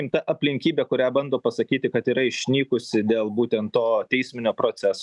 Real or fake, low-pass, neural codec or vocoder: real; 10.8 kHz; none